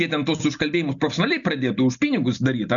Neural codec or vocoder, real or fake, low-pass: none; real; 7.2 kHz